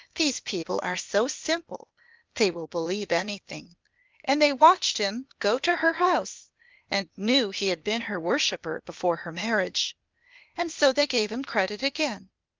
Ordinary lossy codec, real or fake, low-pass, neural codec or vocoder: Opus, 24 kbps; fake; 7.2 kHz; codec, 16 kHz, 0.8 kbps, ZipCodec